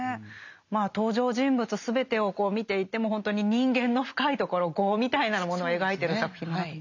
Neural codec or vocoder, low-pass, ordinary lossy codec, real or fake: none; 7.2 kHz; none; real